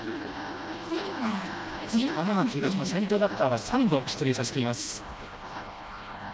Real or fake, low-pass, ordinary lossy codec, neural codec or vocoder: fake; none; none; codec, 16 kHz, 1 kbps, FreqCodec, smaller model